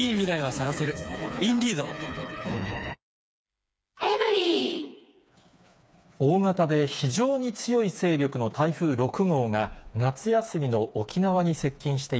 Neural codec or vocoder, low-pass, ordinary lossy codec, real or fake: codec, 16 kHz, 4 kbps, FreqCodec, smaller model; none; none; fake